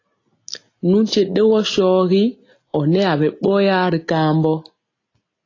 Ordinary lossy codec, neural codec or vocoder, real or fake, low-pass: AAC, 32 kbps; none; real; 7.2 kHz